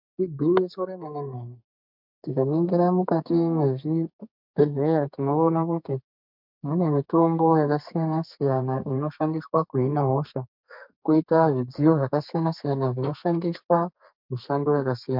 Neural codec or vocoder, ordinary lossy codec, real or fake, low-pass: codec, 32 kHz, 1.9 kbps, SNAC; MP3, 48 kbps; fake; 5.4 kHz